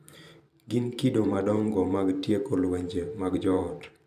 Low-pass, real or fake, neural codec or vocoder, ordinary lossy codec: 14.4 kHz; fake; vocoder, 44.1 kHz, 128 mel bands every 512 samples, BigVGAN v2; none